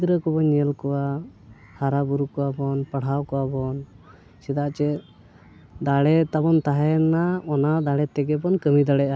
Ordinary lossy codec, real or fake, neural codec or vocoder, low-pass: none; real; none; none